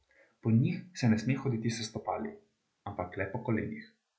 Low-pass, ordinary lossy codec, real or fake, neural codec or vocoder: none; none; real; none